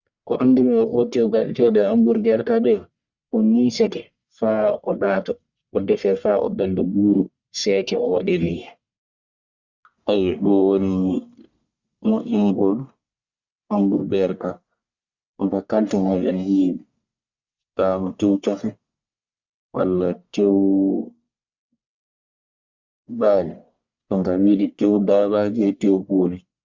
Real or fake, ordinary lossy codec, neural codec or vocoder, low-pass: fake; Opus, 64 kbps; codec, 44.1 kHz, 1.7 kbps, Pupu-Codec; 7.2 kHz